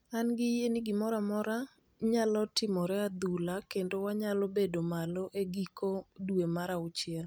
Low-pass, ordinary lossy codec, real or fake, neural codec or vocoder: none; none; real; none